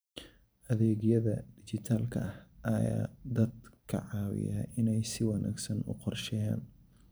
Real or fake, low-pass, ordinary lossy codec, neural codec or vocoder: fake; none; none; vocoder, 44.1 kHz, 128 mel bands every 512 samples, BigVGAN v2